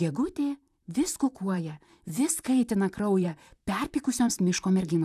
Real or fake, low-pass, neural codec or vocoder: fake; 14.4 kHz; vocoder, 44.1 kHz, 128 mel bands, Pupu-Vocoder